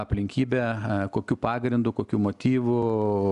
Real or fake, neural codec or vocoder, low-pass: real; none; 10.8 kHz